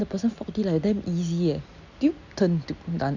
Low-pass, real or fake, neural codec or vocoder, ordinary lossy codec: 7.2 kHz; real; none; none